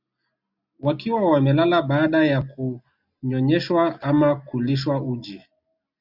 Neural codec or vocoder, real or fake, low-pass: none; real; 5.4 kHz